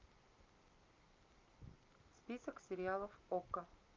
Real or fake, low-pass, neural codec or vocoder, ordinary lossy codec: real; 7.2 kHz; none; Opus, 32 kbps